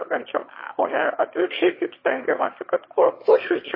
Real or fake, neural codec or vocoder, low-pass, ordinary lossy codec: fake; autoencoder, 22.05 kHz, a latent of 192 numbers a frame, VITS, trained on one speaker; 5.4 kHz; MP3, 24 kbps